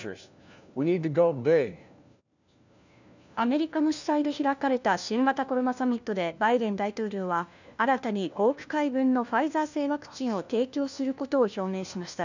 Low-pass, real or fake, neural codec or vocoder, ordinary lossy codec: 7.2 kHz; fake; codec, 16 kHz, 1 kbps, FunCodec, trained on LibriTTS, 50 frames a second; none